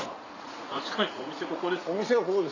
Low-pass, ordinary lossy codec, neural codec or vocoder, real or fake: 7.2 kHz; AAC, 48 kbps; vocoder, 44.1 kHz, 80 mel bands, Vocos; fake